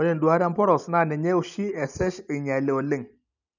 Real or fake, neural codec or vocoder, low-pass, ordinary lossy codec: real; none; 7.2 kHz; none